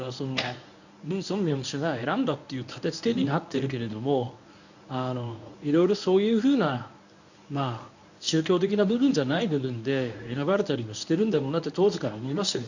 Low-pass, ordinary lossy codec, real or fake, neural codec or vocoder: 7.2 kHz; none; fake; codec, 24 kHz, 0.9 kbps, WavTokenizer, medium speech release version 1